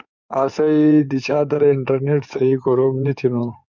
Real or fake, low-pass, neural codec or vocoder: fake; 7.2 kHz; codec, 16 kHz in and 24 kHz out, 2.2 kbps, FireRedTTS-2 codec